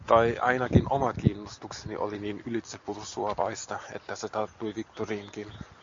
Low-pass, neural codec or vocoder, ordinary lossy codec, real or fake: 7.2 kHz; none; MP3, 48 kbps; real